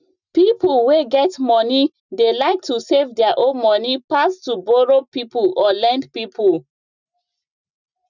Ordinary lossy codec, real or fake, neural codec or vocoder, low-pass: none; real; none; 7.2 kHz